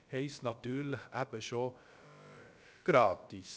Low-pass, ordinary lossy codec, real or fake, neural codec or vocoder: none; none; fake; codec, 16 kHz, about 1 kbps, DyCAST, with the encoder's durations